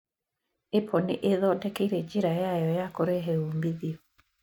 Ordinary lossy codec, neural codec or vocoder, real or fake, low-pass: none; none; real; 19.8 kHz